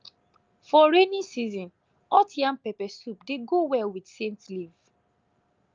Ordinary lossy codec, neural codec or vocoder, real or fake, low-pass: Opus, 24 kbps; none; real; 7.2 kHz